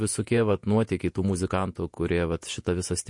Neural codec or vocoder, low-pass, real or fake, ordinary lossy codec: vocoder, 48 kHz, 128 mel bands, Vocos; 10.8 kHz; fake; MP3, 48 kbps